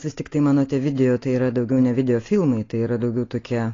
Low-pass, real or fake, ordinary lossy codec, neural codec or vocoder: 7.2 kHz; real; AAC, 32 kbps; none